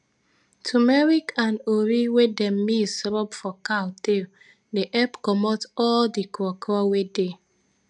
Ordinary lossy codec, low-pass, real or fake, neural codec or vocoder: none; 10.8 kHz; real; none